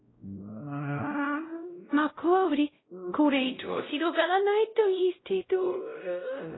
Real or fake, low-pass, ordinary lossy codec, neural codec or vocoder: fake; 7.2 kHz; AAC, 16 kbps; codec, 16 kHz, 0.5 kbps, X-Codec, WavLM features, trained on Multilingual LibriSpeech